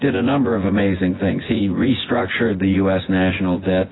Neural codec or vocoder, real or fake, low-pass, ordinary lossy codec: vocoder, 24 kHz, 100 mel bands, Vocos; fake; 7.2 kHz; AAC, 16 kbps